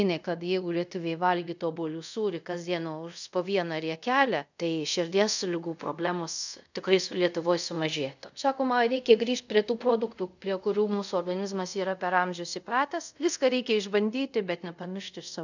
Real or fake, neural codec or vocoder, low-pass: fake; codec, 24 kHz, 0.5 kbps, DualCodec; 7.2 kHz